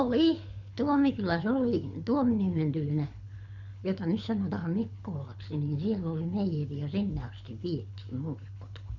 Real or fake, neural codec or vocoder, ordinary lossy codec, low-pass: fake; codec, 24 kHz, 6 kbps, HILCodec; none; 7.2 kHz